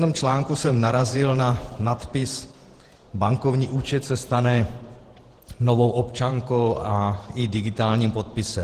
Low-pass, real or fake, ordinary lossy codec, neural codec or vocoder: 14.4 kHz; fake; Opus, 16 kbps; vocoder, 48 kHz, 128 mel bands, Vocos